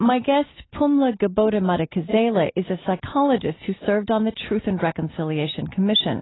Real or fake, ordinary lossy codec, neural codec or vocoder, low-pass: real; AAC, 16 kbps; none; 7.2 kHz